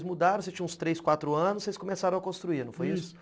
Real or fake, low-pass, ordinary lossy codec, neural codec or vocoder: real; none; none; none